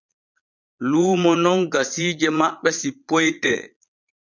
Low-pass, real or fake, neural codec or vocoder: 7.2 kHz; fake; vocoder, 22.05 kHz, 80 mel bands, Vocos